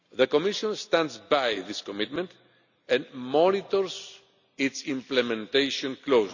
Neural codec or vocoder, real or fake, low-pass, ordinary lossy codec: none; real; 7.2 kHz; none